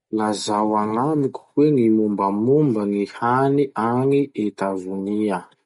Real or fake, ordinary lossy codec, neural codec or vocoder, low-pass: real; MP3, 48 kbps; none; 19.8 kHz